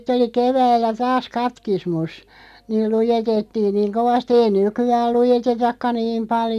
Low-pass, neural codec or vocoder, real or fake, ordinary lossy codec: 14.4 kHz; none; real; none